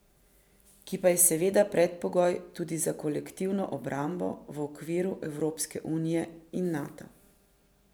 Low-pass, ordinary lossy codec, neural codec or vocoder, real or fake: none; none; vocoder, 44.1 kHz, 128 mel bands every 512 samples, BigVGAN v2; fake